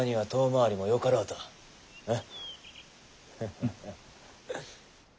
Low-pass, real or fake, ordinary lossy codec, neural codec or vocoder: none; real; none; none